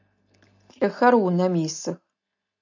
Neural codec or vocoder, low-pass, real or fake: none; 7.2 kHz; real